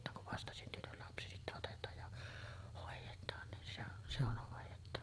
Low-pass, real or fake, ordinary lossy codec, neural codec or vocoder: none; fake; none; vocoder, 22.05 kHz, 80 mel bands, Vocos